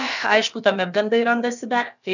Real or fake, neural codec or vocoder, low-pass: fake; codec, 16 kHz, about 1 kbps, DyCAST, with the encoder's durations; 7.2 kHz